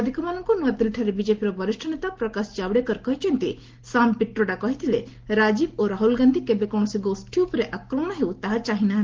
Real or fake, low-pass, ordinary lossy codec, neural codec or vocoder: real; 7.2 kHz; Opus, 16 kbps; none